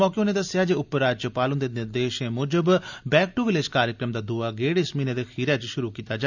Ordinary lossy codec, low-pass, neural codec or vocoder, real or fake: none; 7.2 kHz; none; real